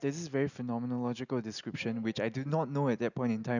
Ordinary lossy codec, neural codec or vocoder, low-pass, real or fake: none; none; 7.2 kHz; real